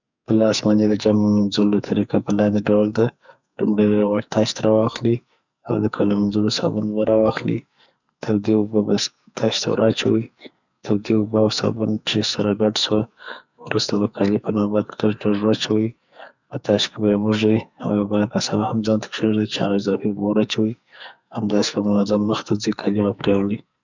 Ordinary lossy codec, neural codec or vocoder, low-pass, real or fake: none; codec, 44.1 kHz, 2.6 kbps, SNAC; 7.2 kHz; fake